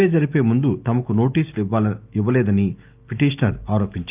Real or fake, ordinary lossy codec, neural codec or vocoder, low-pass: real; Opus, 24 kbps; none; 3.6 kHz